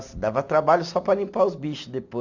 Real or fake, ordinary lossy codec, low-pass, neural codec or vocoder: real; none; 7.2 kHz; none